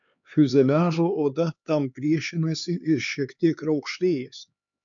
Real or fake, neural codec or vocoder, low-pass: fake; codec, 16 kHz, 4 kbps, X-Codec, HuBERT features, trained on LibriSpeech; 7.2 kHz